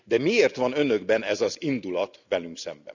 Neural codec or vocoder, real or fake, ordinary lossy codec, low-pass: none; real; none; 7.2 kHz